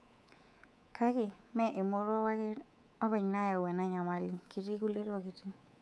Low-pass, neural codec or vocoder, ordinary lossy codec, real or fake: none; codec, 24 kHz, 3.1 kbps, DualCodec; none; fake